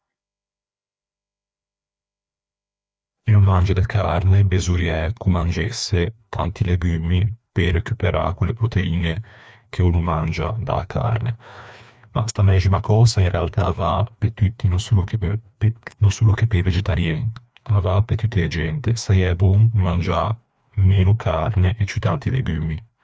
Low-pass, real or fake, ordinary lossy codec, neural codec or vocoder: none; fake; none; codec, 16 kHz, 2 kbps, FreqCodec, larger model